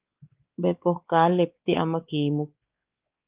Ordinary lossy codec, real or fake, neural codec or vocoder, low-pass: Opus, 24 kbps; fake; codec, 16 kHz, 4 kbps, X-Codec, WavLM features, trained on Multilingual LibriSpeech; 3.6 kHz